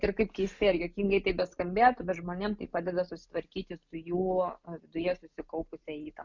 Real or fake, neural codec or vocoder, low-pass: real; none; 7.2 kHz